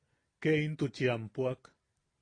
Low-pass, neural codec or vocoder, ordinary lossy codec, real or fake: 9.9 kHz; none; AAC, 32 kbps; real